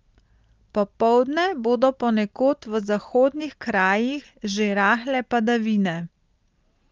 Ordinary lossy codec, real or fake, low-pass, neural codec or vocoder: Opus, 32 kbps; real; 7.2 kHz; none